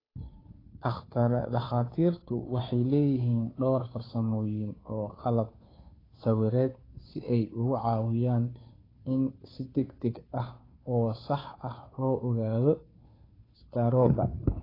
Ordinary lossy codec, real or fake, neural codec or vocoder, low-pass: AAC, 24 kbps; fake; codec, 16 kHz, 2 kbps, FunCodec, trained on Chinese and English, 25 frames a second; 5.4 kHz